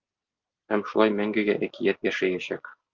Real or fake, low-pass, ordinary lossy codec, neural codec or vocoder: real; 7.2 kHz; Opus, 32 kbps; none